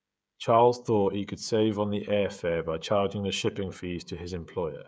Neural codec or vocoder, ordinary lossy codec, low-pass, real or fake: codec, 16 kHz, 16 kbps, FreqCodec, smaller model; none; none; fake